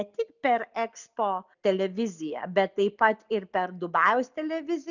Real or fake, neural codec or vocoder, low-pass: real; none; 7.2 kHz